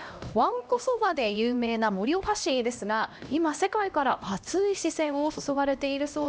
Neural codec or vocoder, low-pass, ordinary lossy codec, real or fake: codec, 16 kHz, 1 kbps, X-Codec, HuBERT features, trained on LibriSpeech; none; none; fake